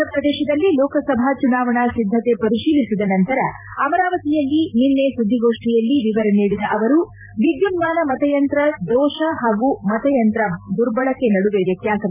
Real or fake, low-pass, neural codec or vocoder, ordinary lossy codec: fake; 3.6 kHz; vocoder, 44.1 kHz, 128 mel bands every 256 samples, BigVGAN v2; none